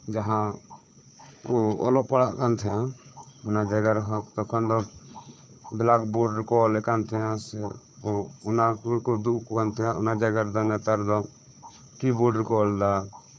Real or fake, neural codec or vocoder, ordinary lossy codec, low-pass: fake; codec, 16 kHz, 4 kbps, FunCodec, trained on Chinese and English, 50 frames a second; none; none